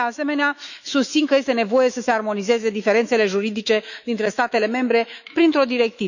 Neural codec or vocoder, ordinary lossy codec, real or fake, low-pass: autoencoder, 48 kHz, 128 numbers a frame, DAC-VAE, trained on Japanese speech; none; fake; 7.2 kHz